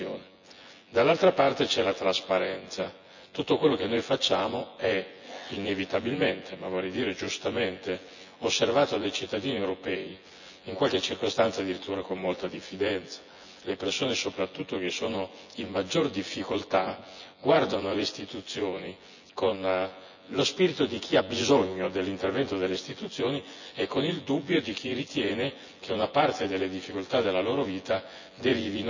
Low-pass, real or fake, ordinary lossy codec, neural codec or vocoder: 7.2 kHz; fake; none; vocoder, 24 kHz, 100 mel bands, Vocos